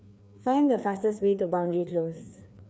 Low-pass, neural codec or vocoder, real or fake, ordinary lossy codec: none; codec, 16 kHz, 2 kbps, FreqCodec, larger model; fake; none